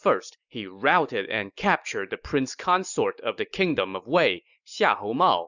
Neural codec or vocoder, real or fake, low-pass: none; real; 7.2 kHz